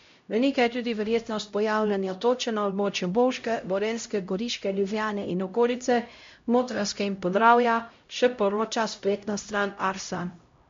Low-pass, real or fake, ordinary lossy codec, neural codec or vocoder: 7.2 kHz; fake; MP3, 48 kbps; codec, 16 kHz, 0.5 kbps, X-Codec, HuBERT features, trained on LibriSpeech